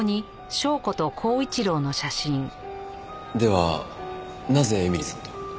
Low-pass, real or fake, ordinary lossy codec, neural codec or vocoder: none; real; none; none